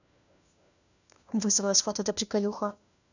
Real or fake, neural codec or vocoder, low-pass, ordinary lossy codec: fake; codec, 16 kHz, 1 kbps, FunCodec, trained on LibriTTS, 50 frames a second; 7.2 kHz; none